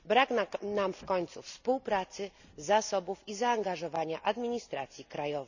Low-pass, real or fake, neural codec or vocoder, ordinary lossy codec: 7.2 kHz; real; none; none